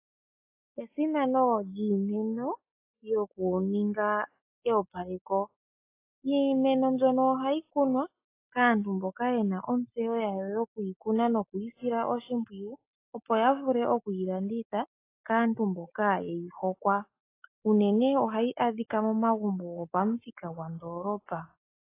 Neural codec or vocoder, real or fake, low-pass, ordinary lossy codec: none; real; 3.6 kHz; AAC, 24 kbps